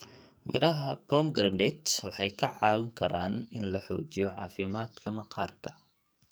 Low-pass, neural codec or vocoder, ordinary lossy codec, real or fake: none; codec, 44.1 kHz, 2.6 kbps, SNAC; none; fake